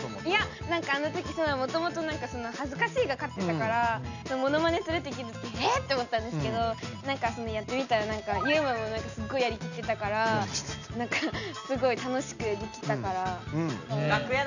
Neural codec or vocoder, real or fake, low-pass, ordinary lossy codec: none; real; 7.2 kHz; none